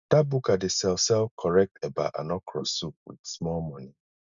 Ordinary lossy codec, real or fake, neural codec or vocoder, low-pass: none; real; none; 7.2 kHz